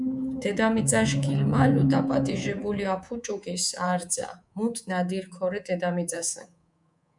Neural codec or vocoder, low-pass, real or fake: codec, 24 kHz, 3.1 kbps, DualCodec; 10.8 kHz; fake